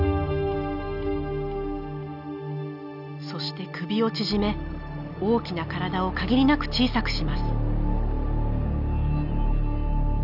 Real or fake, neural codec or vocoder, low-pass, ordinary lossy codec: real; none; 5.4 kHz; none